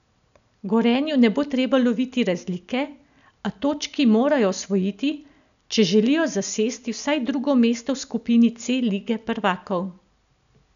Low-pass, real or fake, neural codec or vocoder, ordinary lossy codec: 7.2 kHz; real; none; none